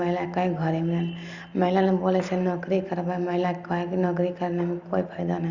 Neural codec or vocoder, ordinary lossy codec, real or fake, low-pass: none; Opus, 64 kbps; real; 7.2 kHz